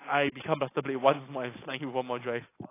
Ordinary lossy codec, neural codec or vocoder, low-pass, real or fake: AAC, 24 kbps; none; 3.6 kHz; real